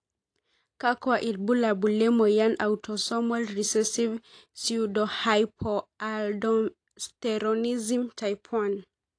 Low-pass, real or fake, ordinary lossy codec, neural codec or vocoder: 9.9 kHz; real; AAC, 48 kbps; none